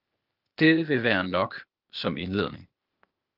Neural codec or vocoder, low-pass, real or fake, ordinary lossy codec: codec, 16 kHz, 0.8 kbps, ZipCodec; 5.4 kHz; fake; Opus, 24 kbps